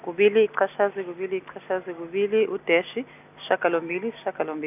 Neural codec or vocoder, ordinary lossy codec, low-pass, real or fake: none; none; 3.6 kHz; real